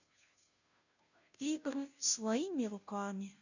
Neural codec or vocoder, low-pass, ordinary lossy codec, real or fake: codec, 16 kHz, 0.5 kbps, FunCodec, trained on Chinese and English, 25 frames a second; 7.2 kHz; none; fake